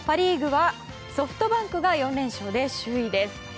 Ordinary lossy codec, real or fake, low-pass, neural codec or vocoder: none; real; none; none